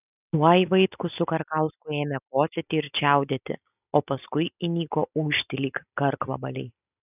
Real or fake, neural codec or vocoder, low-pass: real; none; 3.6 kHz